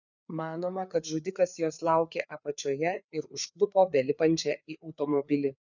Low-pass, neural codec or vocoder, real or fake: 7.2 kHz; codec, 16 kHz, 4 kbps, FreqCodec, larger model; fake